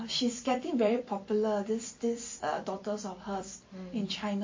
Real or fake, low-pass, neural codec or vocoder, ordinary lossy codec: fake; 7.2 kHz; vocoder, 44.1 kHz, 128 mel bands every 256 samples, BigVGAN v2; MP3, 32 kbps